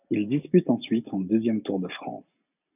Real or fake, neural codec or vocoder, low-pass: real; none; 3.6 kHz